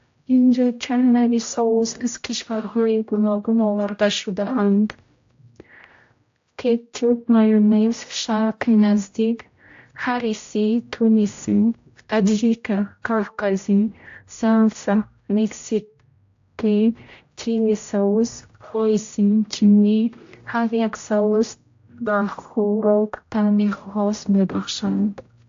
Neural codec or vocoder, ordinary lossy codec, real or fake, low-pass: codec, 16 kHz, 0.5 kbps, X-Codec, HuBERT features, trained on general audio; AAC, 48 kbps; fake; 7.2 kHz